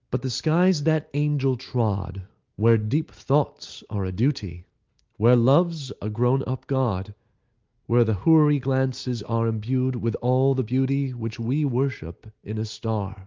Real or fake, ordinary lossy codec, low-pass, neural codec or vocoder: real; Opus, 32 kbps; 7.2 kHz; none